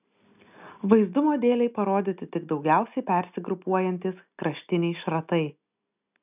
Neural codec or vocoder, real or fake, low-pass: none; real; 3.6 kHz